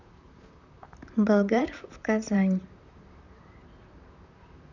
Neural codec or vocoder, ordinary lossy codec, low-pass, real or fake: vocoder, 44.1 kHz, 128 mel bands, Pupu-Vocoder; none; 7.2 kHz; fake